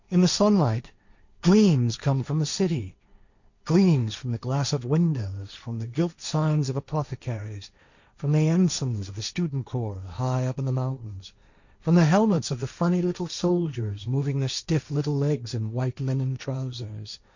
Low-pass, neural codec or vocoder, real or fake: 7.2 kHz; codec, 16 kHz, 1.1 kbps, Voila-Tokenizer; fake